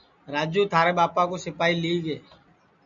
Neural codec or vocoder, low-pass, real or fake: none; 7.2 kHz; real